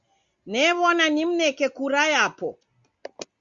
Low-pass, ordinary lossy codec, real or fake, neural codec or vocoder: 7.2 kHz; Opus, 64 kbps; real; none